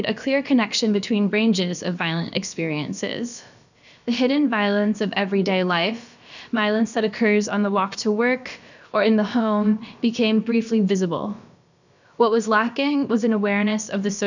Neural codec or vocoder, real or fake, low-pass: codec, 16 kHz, about 1 kbps, DyCAST, with the encoder's durations; fake; 7.2 kHz